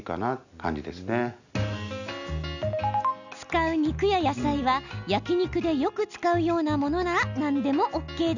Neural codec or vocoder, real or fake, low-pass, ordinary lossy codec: none; real; 7.2 kHz; none